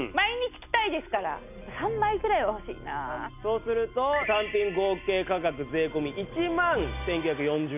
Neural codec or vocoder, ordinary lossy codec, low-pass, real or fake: none; none; 3.6 kHz; real